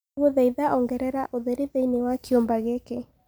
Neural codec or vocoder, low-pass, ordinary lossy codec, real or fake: none; none; none; real